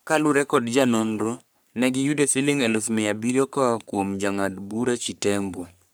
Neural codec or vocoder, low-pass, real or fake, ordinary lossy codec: codec, 44.1 kHz, 3.4 kbps, Pupu-Codec; none; fake; none